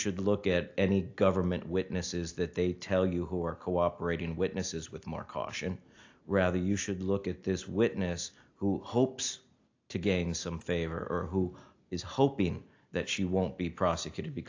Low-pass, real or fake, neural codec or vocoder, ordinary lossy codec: 7.2 kHz; real; none; AAC, 48 kbps